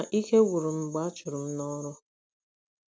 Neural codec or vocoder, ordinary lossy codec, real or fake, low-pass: none; none; real; none